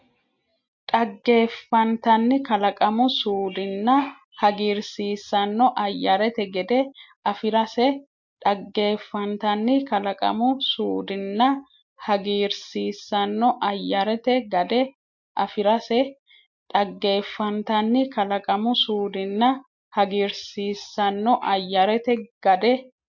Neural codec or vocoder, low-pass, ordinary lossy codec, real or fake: none; 7.2 kHz; MP3, 48 kbps; real